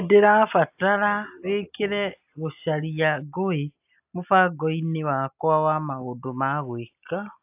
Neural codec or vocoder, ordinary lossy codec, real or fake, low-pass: none; AAC, 32 kbps; real; 3.6 kHz